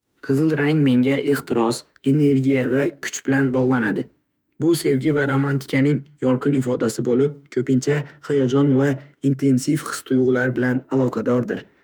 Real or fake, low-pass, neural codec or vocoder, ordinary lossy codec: fake; none; autoencoder, 48 kHz, 32 numbers a frame, DAC-VAE, trained on Japanese speech; none